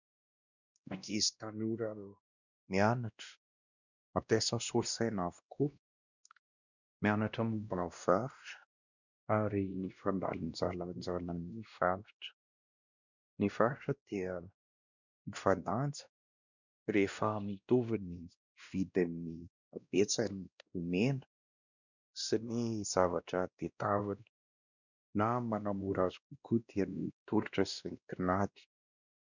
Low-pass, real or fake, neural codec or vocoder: 7.2 kHz; fake; codec, 16 kHz, 1 kbps, X-Codec, WavLM features, trained on Multilingual LibriSpeech